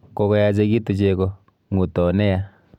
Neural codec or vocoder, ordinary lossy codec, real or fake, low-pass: none; none; real; 19.8 kHz